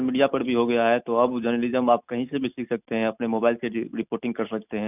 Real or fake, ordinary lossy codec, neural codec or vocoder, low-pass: real; none; none; 3.6 kHz